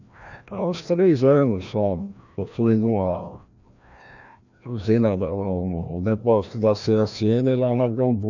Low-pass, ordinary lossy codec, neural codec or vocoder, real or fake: 7.2 kHz; none; codec, 16 kHz, 1 kbps, FreqCodec, larger model; fake